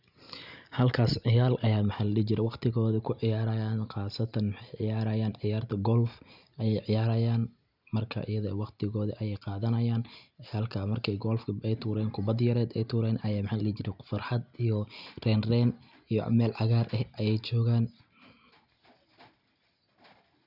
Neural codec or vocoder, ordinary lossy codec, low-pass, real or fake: none; none; 5.4 kHz; real